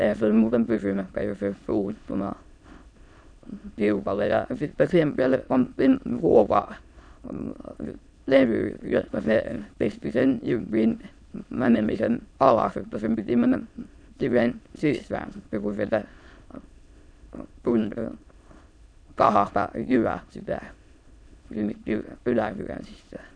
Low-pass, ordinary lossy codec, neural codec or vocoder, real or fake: 9.9 kHz; none; autoencoder, 22.05 kHz, a latent of 192 numbers a frame, VITS, trained on many speakers; fake